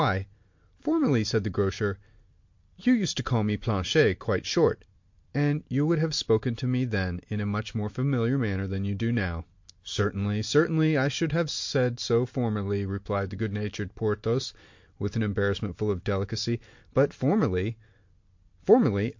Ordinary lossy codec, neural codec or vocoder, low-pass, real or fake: MP3, 48 kbps; none; 7.2 kHz; real